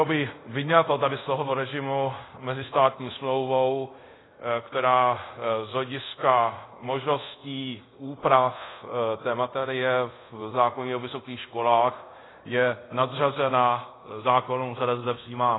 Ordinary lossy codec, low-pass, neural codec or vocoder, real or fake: AAC, 16 kbps; 7.2 kHz; codec, 24 kHz, 0.5 kbps, DualCodec; fake